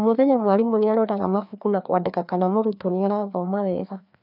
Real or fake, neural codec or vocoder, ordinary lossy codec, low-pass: fake; codec, 16 kHz, 2 kbps, FreqCodec, larger model; none; 5.4 kHz